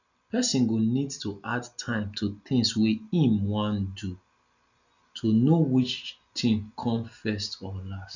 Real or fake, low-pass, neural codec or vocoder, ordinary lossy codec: real; 7.2 kHz; none; none